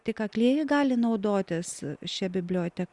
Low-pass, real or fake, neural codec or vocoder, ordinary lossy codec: 10.8 kHz; real; none; Opus, 64 kbps